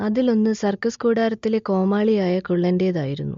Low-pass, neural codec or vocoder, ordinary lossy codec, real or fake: 7.2 kHz; none; MP3, 48 kbps; real